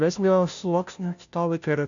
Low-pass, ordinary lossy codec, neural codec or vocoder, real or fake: 7.2 kHz; MP3, 96 kbps; codec, 16 kHz, 0.5 kbps, FunCodec, trained on Chinese and English, 25 frames a second; fake